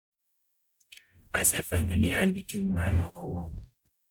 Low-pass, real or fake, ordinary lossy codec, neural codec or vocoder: none; fake; none; codec, 44.1 kHz, 0.9 kbps, DAC